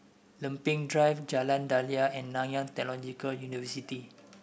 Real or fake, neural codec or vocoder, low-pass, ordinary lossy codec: real; none; none; none